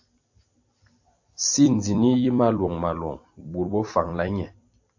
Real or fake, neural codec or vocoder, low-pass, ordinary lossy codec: fake; vocoder, 44.1 kHz, 128 mel bands every 256 samples, BigVGAN v2; 7.2 kHz; AAC, 48 kbps